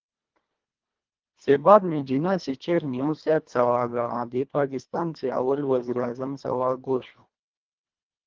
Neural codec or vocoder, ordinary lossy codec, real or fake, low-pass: codec, 24 kHz, 1.5 kbps, HILCodec; Opus, 32 kbps; fake; 7.2 kHz